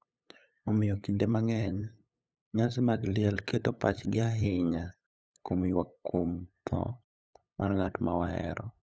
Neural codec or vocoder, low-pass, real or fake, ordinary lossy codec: codec, 16 kHz, 8 kbps, FunCodec, trained on LibriTTS, 25 frames a second; none; fake; none